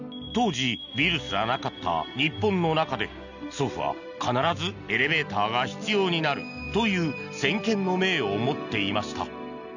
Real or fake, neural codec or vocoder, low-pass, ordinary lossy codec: real; none; 7.2 kHz; none